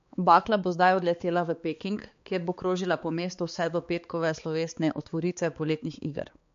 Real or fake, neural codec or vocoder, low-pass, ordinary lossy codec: fake; codec, 16 kHz, 4 kbps, X-Codec, HuBERT features, trained on balanced general audio; 7.2 kHz; MP3, 48 kbps